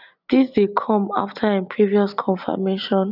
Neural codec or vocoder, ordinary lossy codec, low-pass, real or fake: none; none; 5.4 kHz; real